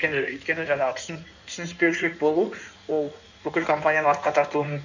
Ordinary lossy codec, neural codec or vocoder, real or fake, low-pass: none; codec, 16 kHz in and 24 kHz out, 1.1 kbps, FireRedTTS-2 codec; fake; 7.2 kHz